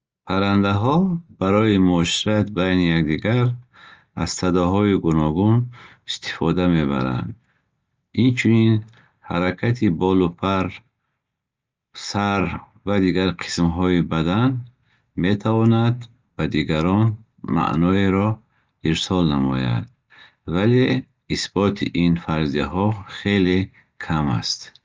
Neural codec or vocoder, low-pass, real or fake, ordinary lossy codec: none; 7.2 kHz; real; Opus, 24 kbps